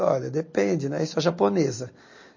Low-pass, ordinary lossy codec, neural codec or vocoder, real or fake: 7.2 kHz; MP3, 32 kbps; none; real